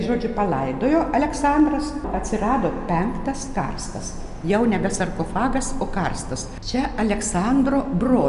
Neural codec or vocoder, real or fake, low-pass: none; real; 10.8 kHz